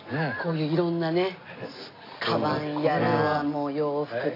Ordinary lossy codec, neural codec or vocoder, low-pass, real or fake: AAC, 32 kbps; none; 5.4 kHz; real